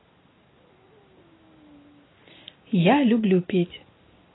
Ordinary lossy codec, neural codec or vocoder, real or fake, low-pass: AAC, 16 kbps; none; real; 7.2 kHz